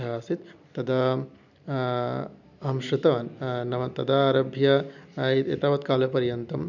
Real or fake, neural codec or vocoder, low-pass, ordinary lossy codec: real; none; 7.2 kHz; none